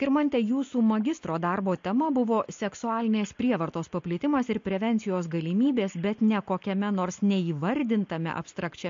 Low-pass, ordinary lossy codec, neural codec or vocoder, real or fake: 7.2 kHz; MP3, 48 kbps; none; real